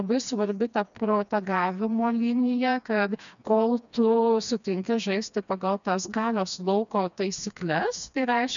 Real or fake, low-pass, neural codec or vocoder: fake; 7.2 kHz; codec, 16 kHz, 2 kbps, FreqCodec, smaller model